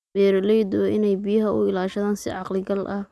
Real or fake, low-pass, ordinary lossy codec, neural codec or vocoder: real; none; none; none